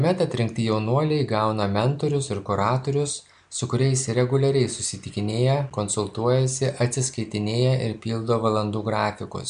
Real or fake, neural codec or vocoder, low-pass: real; none; 9.9 kHz